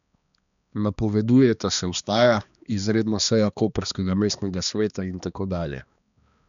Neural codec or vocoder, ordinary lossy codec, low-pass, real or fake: codec, 16 kHz, 2 kbps, X-Codec, HuBERT features, trained on balanced general audio; none; 7.2 kHz; fake